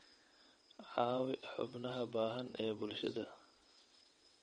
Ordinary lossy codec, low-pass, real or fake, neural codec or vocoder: MP3, 48 kbps; 9.9 kHz; fake; vocoder, 22.05 kHz, 80 mel bands, WaveNeXt